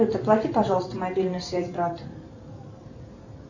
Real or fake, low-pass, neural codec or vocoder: fake; 7.2 kHz; vocoder, 44.1 kHz, 128 mel bands every 512 samples, BigVGAN v2